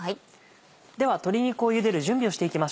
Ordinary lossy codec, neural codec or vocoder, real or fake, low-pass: none; none; real; none